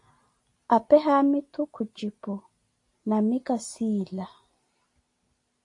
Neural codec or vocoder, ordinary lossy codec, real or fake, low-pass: none; AAC, 48 kbps; real; 10.8 kHz